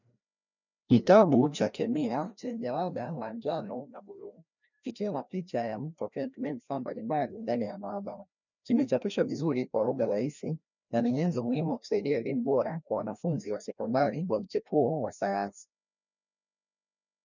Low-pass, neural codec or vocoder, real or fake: 7.2 kHz; codec, 16 kHz, 1 kbps, FreqCodec, larger model; fake